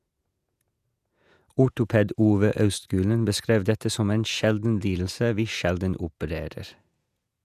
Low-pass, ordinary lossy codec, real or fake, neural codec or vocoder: 14.4 kHz; none; fake; vocoder, 48 kHz, 128 mel bands, Vocos